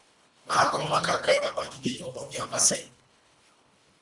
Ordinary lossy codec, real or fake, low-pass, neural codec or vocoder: Opus, 64 kbps; fake; 10.8 kHz; codec, 24 kHz, 1.5 kbps, HILCodec